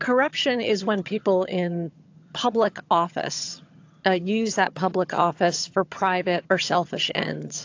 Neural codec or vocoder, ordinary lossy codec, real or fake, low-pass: vocoder, 22.05 kHz, 80 mel bands, HiFi-GAN; AAC, 48 kbps; fake; 7.2 kHz